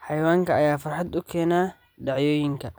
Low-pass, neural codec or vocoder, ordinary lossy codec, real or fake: none; vocoder, 44.1 kHz, 128 mel bands every 256 samples, BigVGAN v2; none; fake